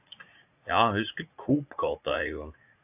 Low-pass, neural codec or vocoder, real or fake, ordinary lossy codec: 3.6 kHz; vocoder, 44.1 kHz, 80 mel bands, Vocos; fake; AAC, 32 kbps